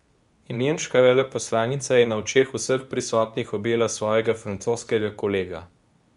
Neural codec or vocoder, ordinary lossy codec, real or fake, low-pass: codec, 24 kHz, 0.9 kbps, WavTokenizer, medium speech release version 2; none; fake; 10.8 kHz